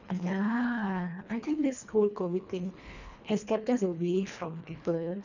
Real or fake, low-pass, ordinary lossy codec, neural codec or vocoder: fake; 7.2 kHz; none; codec, 24 kHz, 1.5 kbps, HILCodec